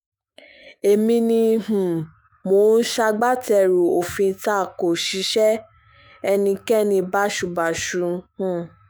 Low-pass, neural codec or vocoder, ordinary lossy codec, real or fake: none; autoencoder, 48 kHz, 128 numbers a frame, DAC-VAE, trained on Japanese speech; none; fake